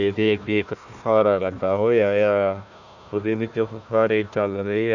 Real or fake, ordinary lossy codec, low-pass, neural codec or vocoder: fake; none; 7.2 kHz; codec, 16 kHz, 1 kbps, FunCodec, trained on Chinese and English, 50 frames a second